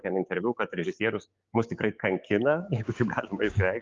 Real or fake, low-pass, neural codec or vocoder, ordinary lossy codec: fake; 7.2 kHz; codec, 16 kHz, 6 kbps, DAC; Opus, 32 kbps